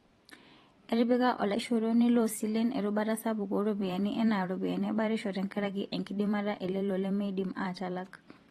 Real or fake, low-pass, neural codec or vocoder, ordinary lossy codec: fake; 19.8 kHz; vocoder, 44.1 kHz, 128 mel bands every 512 samples, BigVGAN v2; AAC, 32 kbps